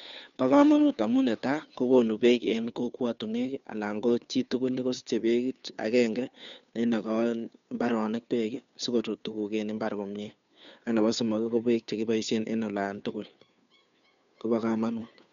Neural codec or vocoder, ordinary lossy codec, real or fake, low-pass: codec, 16 kHz, 2 kbps, FunCodec, trained on Chinese and English, 25 frames a second; none; fake; 7.2 kHz